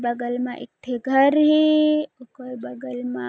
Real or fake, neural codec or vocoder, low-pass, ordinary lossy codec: real; none; none; none